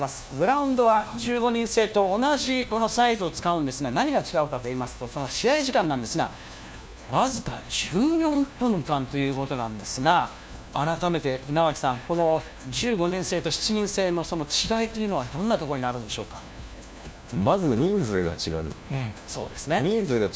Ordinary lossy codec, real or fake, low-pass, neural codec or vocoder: none; fake; none; codec, 16 kHz, 1 kbps, FunCodec, trained on LibriTTS, 50 frames a second